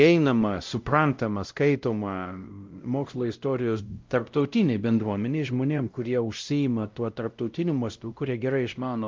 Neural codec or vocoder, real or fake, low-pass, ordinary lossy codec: codec, 16 kHz, 0.5 kbps, X-Codec, WavLM features, trained on Multilingual LibriSpeech; fake; 7.2 kHz; Opus, 32 kbps